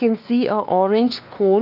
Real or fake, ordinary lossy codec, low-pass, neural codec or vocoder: fake; none; 5.4 kHz; codec, 16 kHz, 2 kbps, X-Codec, WavLM features, trained on Multilingual LibriSpeech